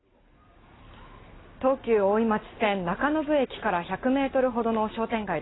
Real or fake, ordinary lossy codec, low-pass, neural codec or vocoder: real; AAC, 16 kbps; 7.2 kHz; none